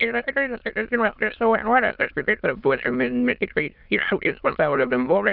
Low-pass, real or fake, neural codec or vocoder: 5.4 kHz; fake; autoencoder, 22.05 kHz, a latent of 192 numbers a frame, VITS, trained on many speakers